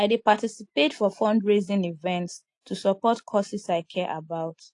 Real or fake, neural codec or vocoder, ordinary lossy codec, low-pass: real; none; AAC, 48 kbps; 10.8 kHz